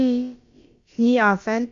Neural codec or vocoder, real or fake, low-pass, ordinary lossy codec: codec, 16 kHz, about 1 kbps, DyCAST, with the encoder's durations; fake; 7.2 kHz; Opus, 64 kbps